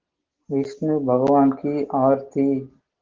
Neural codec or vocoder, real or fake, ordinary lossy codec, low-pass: none; real; Opus, 16 kbps; 7.2 kHz